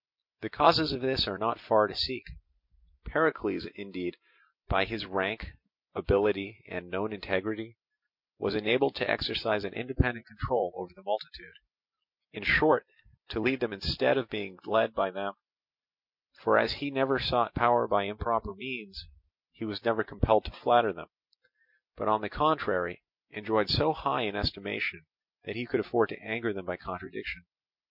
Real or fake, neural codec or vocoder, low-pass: real; none; 5.4 kHz